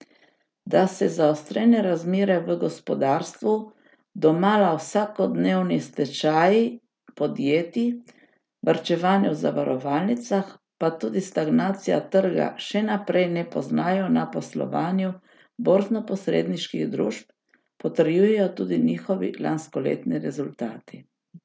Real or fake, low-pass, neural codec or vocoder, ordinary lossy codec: real; none; none; none